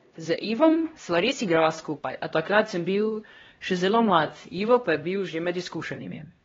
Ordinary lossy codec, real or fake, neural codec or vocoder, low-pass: AAC, 24 kbps; fake; codec, 16 kHz, 1 kbps, X-Codec, HuBERT features, trained on LibriSpeech; 7.2 kHz